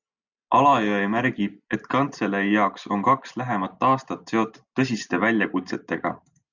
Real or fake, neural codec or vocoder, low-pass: real; none; 7.2 kHz